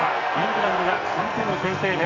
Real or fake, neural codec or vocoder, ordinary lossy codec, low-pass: fake; codec, 44.1 kHz, 2.6 kbps, SNAC; none; 7.2 kHz